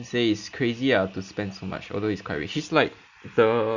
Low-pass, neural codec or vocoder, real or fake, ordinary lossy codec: 7.2 kHz; none; real; none